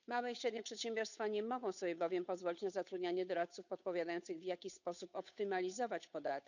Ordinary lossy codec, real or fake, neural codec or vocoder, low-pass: none; fake; codec, 16 kHz, 8 kbps, FunCodec, trained on Chinese and English, 25 frames a second; 7.2 kHz